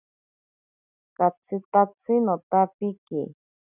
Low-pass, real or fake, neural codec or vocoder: 3.6 kHz; real; none